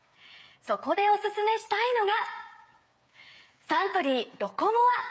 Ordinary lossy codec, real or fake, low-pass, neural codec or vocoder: none; fake; none; codec, 16 kHz, 8 kbps, FreqCodec, smaller model